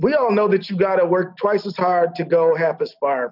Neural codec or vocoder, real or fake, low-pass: none; real; 5.4 kHz